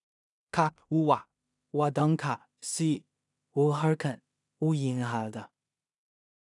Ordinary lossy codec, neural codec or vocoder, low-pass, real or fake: none; codec, 16 kHz in and 24 kHz out, 0.4 kbps, LongCat-Audio-Codec, two codebook decoder; 10.8 kHz; fake